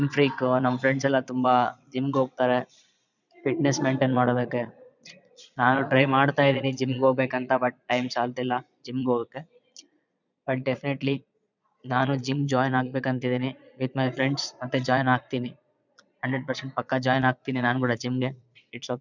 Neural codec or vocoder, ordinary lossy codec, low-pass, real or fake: vocoder, 22.05 kHz, 80 mel bands, Vocos; none; 7.2 kHz; fake